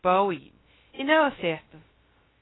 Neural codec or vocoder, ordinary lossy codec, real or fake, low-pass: codec, 16 kHz, 0.2 kbps, FocalCodec; AAC, 16 kbps; fake; 7.2 kHz